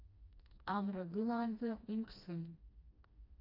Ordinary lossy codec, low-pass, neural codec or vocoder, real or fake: AAC, 32 kbps; 5.4 kHz; codec, 16 kHz, 1 kbps, FreqCodec, smaller model; fake